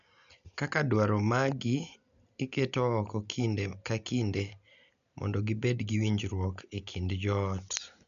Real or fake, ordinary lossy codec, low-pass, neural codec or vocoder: real; none; 7.2 kHz; none